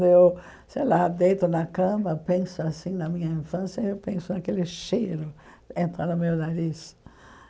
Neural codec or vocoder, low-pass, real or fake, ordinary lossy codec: none; none; real; none